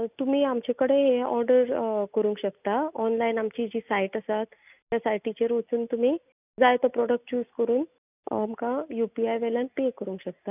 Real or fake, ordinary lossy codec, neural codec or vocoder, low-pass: real; none; none; 3.6 kHz